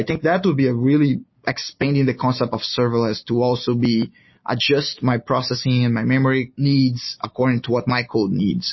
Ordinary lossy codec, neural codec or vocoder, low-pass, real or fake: MP3, 24 kbps; none; 7.2 kHz; real